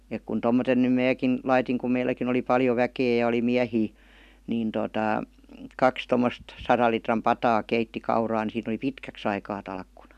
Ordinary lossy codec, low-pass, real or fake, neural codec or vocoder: none; 14.4 kHz; real; none